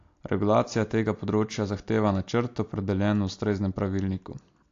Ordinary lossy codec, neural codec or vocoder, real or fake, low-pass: AAC, 48 kbps; none; real; 7.2 kHz